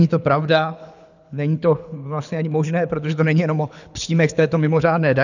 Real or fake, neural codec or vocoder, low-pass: fake; codec, 24 kHz, 6 kbps, HILCodec; 7.2 kHz